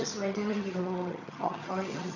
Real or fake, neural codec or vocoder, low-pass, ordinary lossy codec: fake; vocoder, 22.05 kHz, 80 mel bands, HiFi-GAN; 7.2 kHz; none